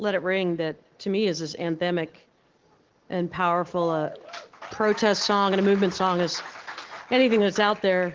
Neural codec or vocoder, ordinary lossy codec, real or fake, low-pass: none; Opus, 16 kbps; real; 7.2 kHz